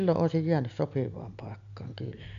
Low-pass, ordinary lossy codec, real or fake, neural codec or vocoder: 7.2 kHz; none; real; none